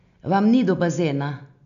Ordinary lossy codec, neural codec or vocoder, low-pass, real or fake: MP3, 96 kbps; none; 7.2 kHz; real